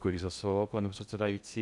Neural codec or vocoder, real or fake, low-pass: codec, 16 kHz in and 24 kHz out, 0.6 kbps, FocalCodec, streaming, 2048 codes; fake; 10.8 kHz